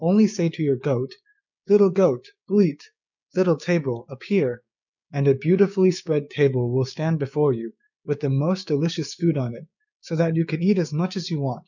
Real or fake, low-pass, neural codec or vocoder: fake; 7.2 kHz; codec, 16 kHz, 6 kbps, DAC